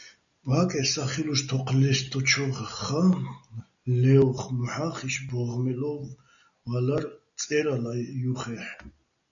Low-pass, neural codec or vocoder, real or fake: 7.2 kHz; none; real